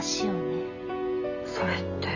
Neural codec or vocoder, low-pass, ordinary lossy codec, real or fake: none; 7.2 kHz; none; real